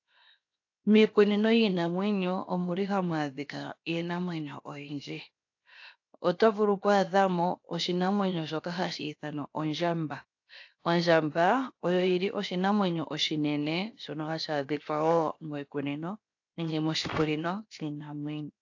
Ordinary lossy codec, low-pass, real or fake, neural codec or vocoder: AAC, 48 kbps; 7.2 kHz; fake; codec, 16 kHz, 0.7 kbps, FocalCodec